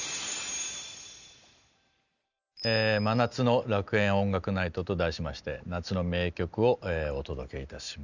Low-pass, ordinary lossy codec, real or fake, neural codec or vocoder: 7.2 kHz; none; real; none